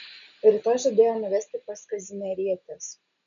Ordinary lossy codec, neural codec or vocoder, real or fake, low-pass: MP3, 96 kbps; none; real; 7.2 kHz